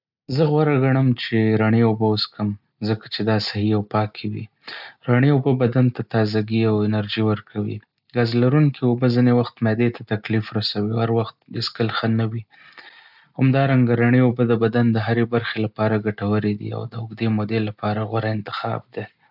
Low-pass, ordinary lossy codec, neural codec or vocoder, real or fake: 5.4 kHz; none; none; real